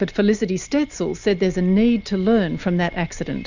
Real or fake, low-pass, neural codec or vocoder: real; 7.2 kHz; none